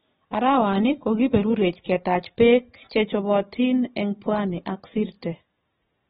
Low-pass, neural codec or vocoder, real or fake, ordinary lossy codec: 7.2 kHz; none; real; AAC, 16 kbps